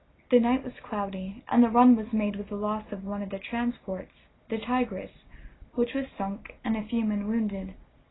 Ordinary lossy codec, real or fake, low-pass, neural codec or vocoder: AAC, 16 kbps; real; 7.2 kHz; none